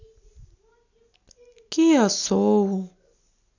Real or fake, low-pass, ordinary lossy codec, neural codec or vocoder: real; 7.2 kHz; none; none